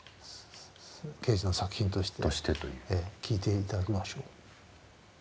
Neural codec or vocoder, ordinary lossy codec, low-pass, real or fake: none; none; none; real